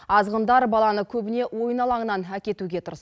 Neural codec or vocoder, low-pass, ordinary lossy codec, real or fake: none; none; none; real